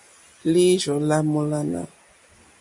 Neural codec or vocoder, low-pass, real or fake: none; 10.8 kHz; real